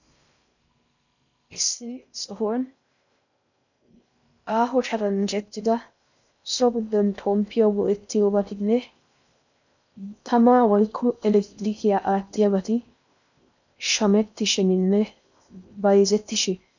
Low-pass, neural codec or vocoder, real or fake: 7.2 kHz; codec, 16 kHz in and 24 kHz out, 0.6 kbps, FocalCodec, streaming, 4096 codes; fake